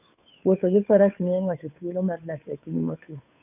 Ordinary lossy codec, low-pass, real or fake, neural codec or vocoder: none; 3.6 kHz; fake; codec, 16 kHz, 2 kbps, FunCodec, trained on Chinese and English, 25 frames a second